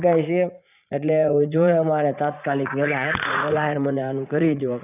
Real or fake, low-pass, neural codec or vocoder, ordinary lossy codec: fake; 3.6 kHz; vocoder, 44.1 kHz, 80 mel bands, Vocos; none